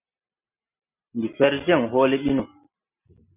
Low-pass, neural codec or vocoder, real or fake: 3.6 kHz; none; real